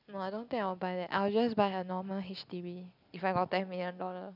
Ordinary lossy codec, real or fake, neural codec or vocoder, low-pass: none; real; none; 5.4 kHz